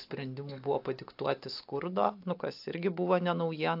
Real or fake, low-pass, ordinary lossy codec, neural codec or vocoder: real; 5.4 kHz; AAC, 48 kbps; none